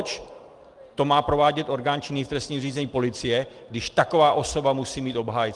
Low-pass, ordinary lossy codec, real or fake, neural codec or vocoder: 10.8 kHz; Opus, 24 kbps; real; none